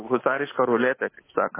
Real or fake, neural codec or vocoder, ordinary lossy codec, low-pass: fake; codec, 24 kHz, 3.1 kbps, DualCodec; MP3, 16 kbps; 3.6 kHz